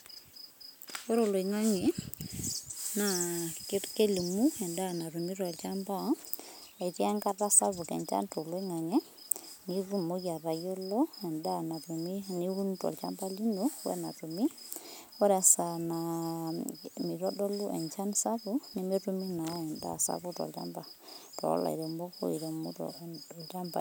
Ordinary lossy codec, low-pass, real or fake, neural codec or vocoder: none; none; real; none